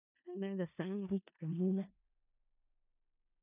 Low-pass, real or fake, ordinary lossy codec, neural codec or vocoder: 3.6 kHz; fake; none; codec, 16 kHz in and 24 kHz out, 0.4 kbps, LongCat-Audio-Codec, four codebook decoder